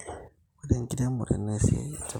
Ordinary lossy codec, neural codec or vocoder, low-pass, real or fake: none; none; 19.8 kHz; real